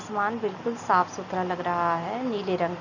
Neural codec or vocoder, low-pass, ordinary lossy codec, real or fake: none; 7.2 kHz; none; real